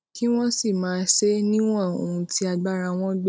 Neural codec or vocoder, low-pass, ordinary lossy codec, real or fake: none; none; none; real